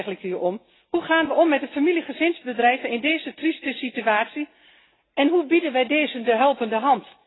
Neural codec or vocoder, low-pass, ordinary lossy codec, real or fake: none; 7.2 kHz; AAC, 16 kbps; real